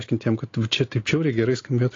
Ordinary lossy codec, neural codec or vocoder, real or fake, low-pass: AAC, 32 kbps; none; real; 7.2 kHz